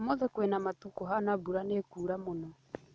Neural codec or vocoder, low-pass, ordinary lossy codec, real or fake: none; none; none; real